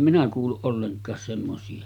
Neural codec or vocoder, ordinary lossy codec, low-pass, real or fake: none; none; 19.8 kHz; real